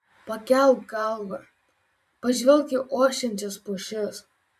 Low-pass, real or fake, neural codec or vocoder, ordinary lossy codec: 14.4 kHz; fake; vocoder, 44.1 kHz, 128 mel bands every 256 samples, BigVGAN v2; MP3, 96 kbps